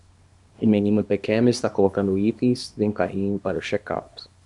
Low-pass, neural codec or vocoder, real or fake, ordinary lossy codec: 10.8 kHz; codec, 24 kHz, 0.9 kbps, WavTokenizer, small release; fake; MP3, 96 kbps